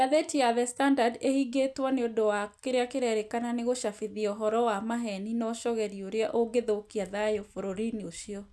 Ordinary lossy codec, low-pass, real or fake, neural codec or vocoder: none; none; real; none